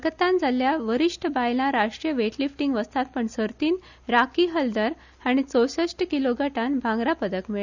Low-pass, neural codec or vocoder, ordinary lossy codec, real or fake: 7.2 kHz; none; none; real